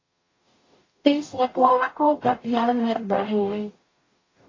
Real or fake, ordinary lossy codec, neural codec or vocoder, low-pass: fake; AAC, 32 kbps; codec, 44.1 kHz, 0.9 kbps, DAC; 7.2 kHz